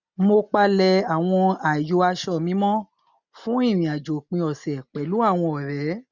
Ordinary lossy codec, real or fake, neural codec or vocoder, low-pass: none; real; none; 7.2 kHz